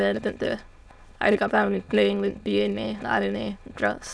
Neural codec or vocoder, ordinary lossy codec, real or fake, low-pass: autoencoder, 22.05 kHz, a latent of 192 numbers a frame, VITS, trained on many speakers; none; fake; none